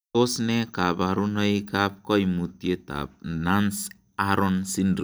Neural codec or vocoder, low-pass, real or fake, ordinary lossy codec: none; none; real; none